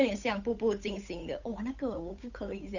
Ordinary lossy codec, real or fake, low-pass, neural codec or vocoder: none; fake; 7.2 kHz; codec, 16 kHz, 8 kbps, FunCodec, trained on Chinese and English, 25 frames a second